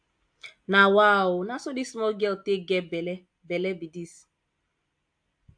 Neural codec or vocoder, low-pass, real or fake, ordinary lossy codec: none; 9.9 kHz; real; AAC, 64 kbps